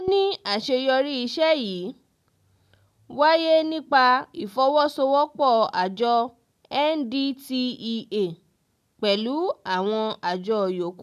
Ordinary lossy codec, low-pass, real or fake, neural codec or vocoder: none; 14.4 kHz; real; none